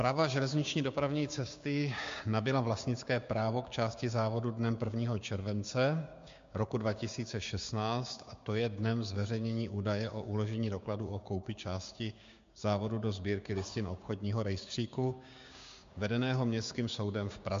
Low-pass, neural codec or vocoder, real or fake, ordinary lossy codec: 7.2 kHz; codec, 16 kHz, 6 kbps, DAC; fake; MP3, 48 kbps